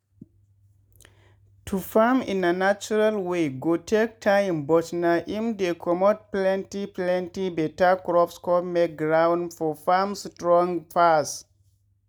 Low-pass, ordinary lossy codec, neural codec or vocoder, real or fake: none; none; none; real